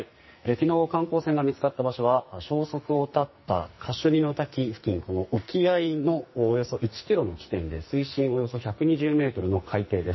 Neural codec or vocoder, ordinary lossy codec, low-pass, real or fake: codec, 44.1 kHz, 2.6 kbps, SNAC; MP3, 24 kbps; 7.2 kHz; fake